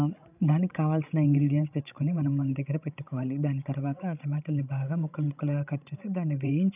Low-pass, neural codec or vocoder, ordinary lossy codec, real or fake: 3.6 kHz; codec, 16 kHz, 16 kbps, FreqCodec, larger model; none; fake